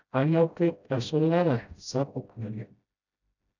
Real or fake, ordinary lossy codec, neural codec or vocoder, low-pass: fake; none; codec, 16 kHz, 0.5 kbps, FreqCodec, smaller model; 7.2 kHz